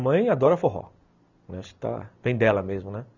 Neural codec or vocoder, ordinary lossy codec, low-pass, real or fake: none; none; 7.2 kHz; real